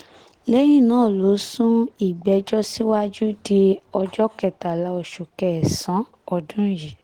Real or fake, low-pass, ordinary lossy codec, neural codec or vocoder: real; 19.8 kHz; Opus, 16 kbps; none